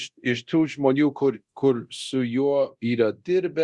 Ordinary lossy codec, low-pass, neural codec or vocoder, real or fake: Opus, 64 kbps; 10.8 kHz; codec, 24 kHz, 0.9 kbps, DualCodec; fake